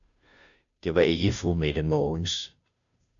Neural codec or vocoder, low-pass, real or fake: codec, 16 kHz, 0.5 kbps, FunCodec, trained on Chinese and English, 25 frames a second; 7.2 kHz; fake